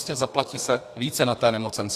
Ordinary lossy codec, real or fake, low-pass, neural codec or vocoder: AAC, 64 kbps; fake; 14.4 kHz; codec, 32 kHz, 1.9 kbps, SNAC